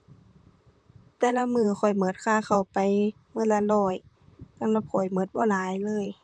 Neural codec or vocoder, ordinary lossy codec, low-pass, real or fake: vocoder, 44.1 kHz, 128 mel bands, Pupu-Vocoder; MP3, 96 kbps; 9.9 kHz; fake